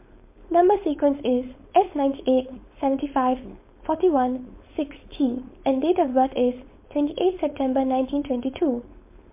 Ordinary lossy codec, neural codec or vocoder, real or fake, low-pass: MP3, 24 kbps; codec, 16 kHz, 4.8 kbps, FACodec; fake; 3.6 kHz